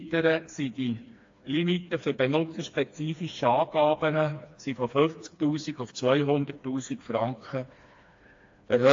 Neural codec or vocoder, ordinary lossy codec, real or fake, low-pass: codec, 16 kHz, 2 kbps, FreqCodec, smaller model; AAC, 48 kbps; fake; 7.2 kHz